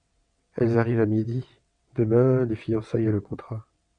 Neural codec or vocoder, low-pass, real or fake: vocoder, 22.05 kHz, 80 mel bands, WaveNeXt; 9.9 kHz; fake